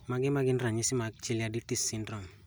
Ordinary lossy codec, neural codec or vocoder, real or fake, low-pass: none; none; real; none